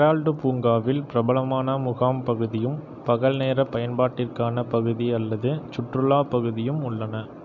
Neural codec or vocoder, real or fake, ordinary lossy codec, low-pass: none; real; none; 7.2 kHz